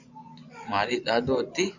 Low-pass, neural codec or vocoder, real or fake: 7.2 kHz; vocoder, 24 kHz, 100 mel bands, Vocos; fake